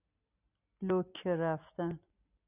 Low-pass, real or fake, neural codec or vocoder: 3.6 kHz; real; none